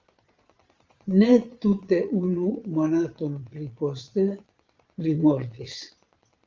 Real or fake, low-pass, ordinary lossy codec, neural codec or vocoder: fake; 7.2 kHz; Opus, 32 kbps; vocoder, 22.05 kHz, 80 mel bands, Vocos